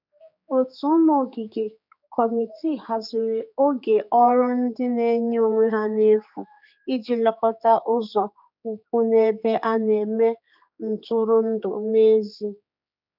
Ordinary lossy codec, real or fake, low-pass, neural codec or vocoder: none; fake; 5.4 kHz; codec, 16 kHz, 4 kbps, X-Codec, HuBERT features, trained on general audio